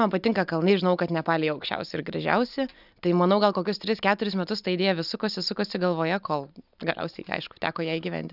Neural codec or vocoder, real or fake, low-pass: none; real; 5.4 kHz